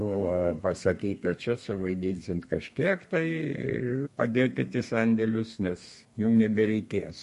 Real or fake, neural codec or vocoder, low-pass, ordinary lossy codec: fake; codec, 32 kHz, 1.9 kbps, SNAC; 14.4 kHz; MP3, 48 kbps